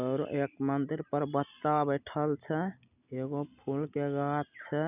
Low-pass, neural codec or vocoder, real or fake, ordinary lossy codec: 3.6 kHz; none; real; none